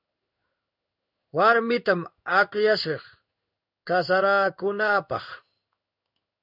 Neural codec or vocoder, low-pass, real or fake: codec, 16 kHz in and 24 kHz out, 1 kbps, XY-Tokenizer; 5.4 kHz; fake